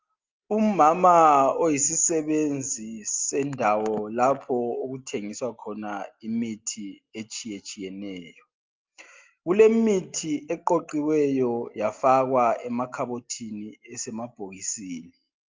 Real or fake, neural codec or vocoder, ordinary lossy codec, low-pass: real; none; Opus, 32 kbps; 7.2 kHz